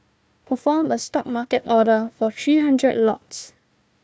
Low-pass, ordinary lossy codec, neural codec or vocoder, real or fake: none; none; codec, 16 kHz, 1 kbps, FunCodec, trained on Chinese and English, 50 frames a second; fake